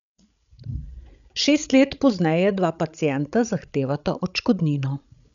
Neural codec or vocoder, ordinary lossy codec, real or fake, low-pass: codec, 16 kHz, 16 kbps, FreqCodec, larger model; none; fake; 7.2 kHz